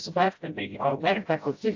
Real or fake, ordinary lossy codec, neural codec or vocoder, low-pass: fake; AAC, 48 kbps; codec, 16 kHz, 0.5 kbps, FreqCodec, smaller model; 7.2 kHz